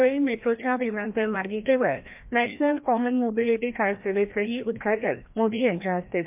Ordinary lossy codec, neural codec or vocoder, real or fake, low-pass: MP3, 32 kbps; codec, 16 kHz, 1 kbps, FreqCodec, larger model; fake; 3.6 kHz